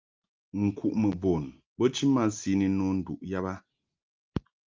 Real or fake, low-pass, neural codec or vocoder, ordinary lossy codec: real; 7.2 kHz; none; Opus, 24 kbps